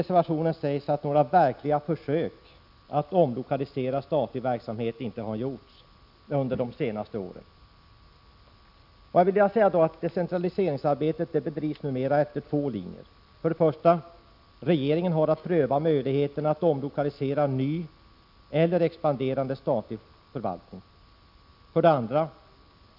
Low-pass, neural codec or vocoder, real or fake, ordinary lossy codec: 5.4 kHz; none; real; none